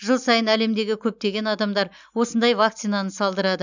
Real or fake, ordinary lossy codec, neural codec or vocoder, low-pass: real; none; none; 7.2 kHz